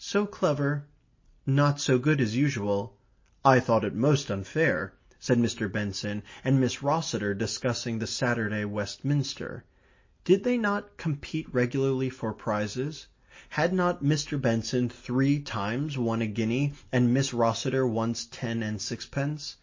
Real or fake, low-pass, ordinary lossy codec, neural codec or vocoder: real; 7.2 kHz; MP3, 32 kbps; none